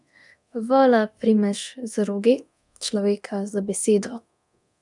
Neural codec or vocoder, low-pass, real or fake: codec, 24 kHz, 0.9 kbps, DualCodec; 10.8 kHz; fake